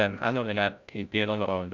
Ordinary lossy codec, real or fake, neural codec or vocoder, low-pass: none; fake; codec, 16 kHz, 0.5 kbps, FreqCodec, larger model; 7.2 kHz